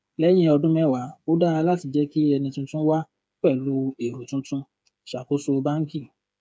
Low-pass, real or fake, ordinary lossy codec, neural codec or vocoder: none; fake; none; codec, 16 kHz, 8 kbps, FreqCodec, smaller model